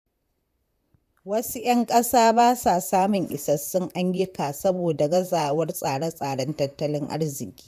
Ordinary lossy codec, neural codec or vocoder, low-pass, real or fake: none; vocoder, 44.1 kHz, 128 mel bands, Pupu-Vocoder; 14.4 kHz; fake